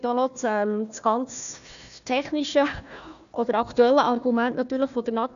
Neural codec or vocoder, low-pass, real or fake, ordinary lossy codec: codec, 16 kHz, 1 kbps, FunCodec, trained on Chinese and English, 50 frames a second; 7.2 kHz; fake; none